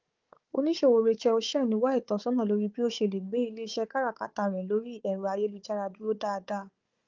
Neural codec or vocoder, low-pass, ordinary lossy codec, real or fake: codec, 16 kHz, 4 kbps, FunCodec, trained on Chinese and English, 50 frames a second; 7.2 kHz; Opus, 32 kbps; fake